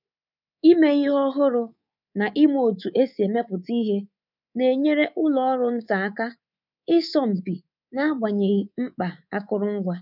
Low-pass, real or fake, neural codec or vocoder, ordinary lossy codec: 5.4 kHz; fake; codec, 24 kHz, 3.1 kbps, DualCodec; none